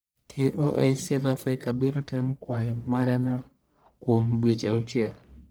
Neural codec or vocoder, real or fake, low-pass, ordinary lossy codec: codec, 44.1 kHz, 1.7 kbps, Pupu-Codec; fake; none; none